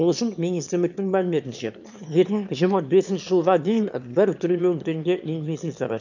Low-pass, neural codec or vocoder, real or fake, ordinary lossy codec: 7.2 kHz; autoencoder, 22.05 kHz, a latent of 192 numbers a frame, VITS, trained on one speaker; fake; none